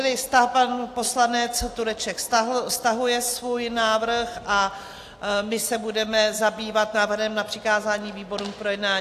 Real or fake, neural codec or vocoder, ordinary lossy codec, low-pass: real; none; AAC, 64 kbps; 14.4 kHz